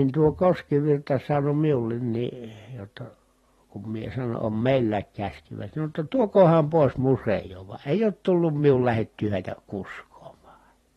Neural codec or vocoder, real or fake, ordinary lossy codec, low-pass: vocoder, 44.1 kHz, 128 mel bands every 512 samples, BigVGAN v2; fake; AAC, 32 kbps; 19.8 kHz